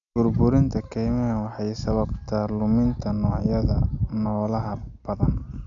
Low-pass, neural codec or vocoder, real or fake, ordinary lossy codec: 7.2 kHz; none; real; none